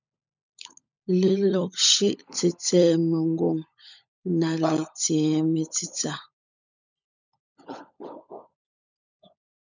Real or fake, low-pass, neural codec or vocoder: fake; 7.2 kHz; codec, 16 kHz, 16 kbps, FunCodec, trained on LibriTTS, 50 frames a second